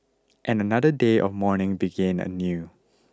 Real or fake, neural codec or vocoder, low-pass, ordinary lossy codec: real; none; none; none